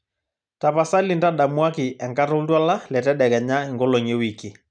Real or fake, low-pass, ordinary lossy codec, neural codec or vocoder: real; 9.9 kHz; none; none